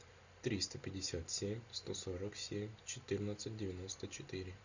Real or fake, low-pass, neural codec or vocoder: real; 7.2 kHz; none